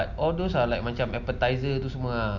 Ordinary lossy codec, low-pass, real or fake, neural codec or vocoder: none; 7.2 kHz; real; none